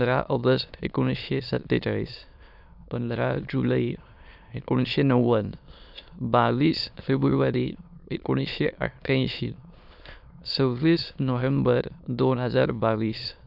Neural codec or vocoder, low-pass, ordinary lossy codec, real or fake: autoencoder, 22.05 kHz, a latent of 192 numbers a frame, VITS, trained on many speakers; 5.4 kHz; none; fake